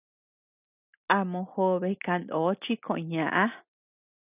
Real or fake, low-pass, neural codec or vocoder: real; 3.6 kHz; none